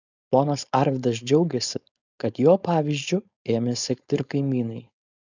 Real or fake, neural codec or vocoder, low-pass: fake; codec, 16 kHz, 4.8 kbps, FACodec; 7.2 kHz